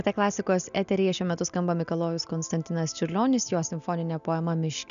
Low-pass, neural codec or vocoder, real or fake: 7.2 kHz; none; real